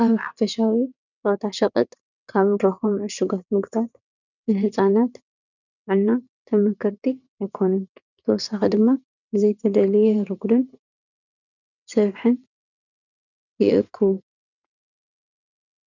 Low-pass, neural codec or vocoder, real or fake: 7.2 kHz; vocoder, 22.05 kHz, 80 mel bands, WaveNeXt; fake